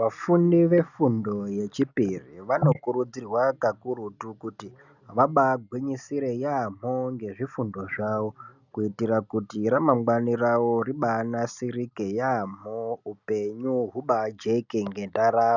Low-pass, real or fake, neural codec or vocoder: 7.2 kHz; real; none